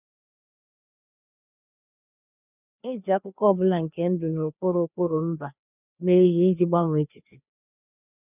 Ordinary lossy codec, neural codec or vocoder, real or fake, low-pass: none; codec, 16 kHz, 2 kbps, FreqCodec, larger model; fake; 3.6 kHz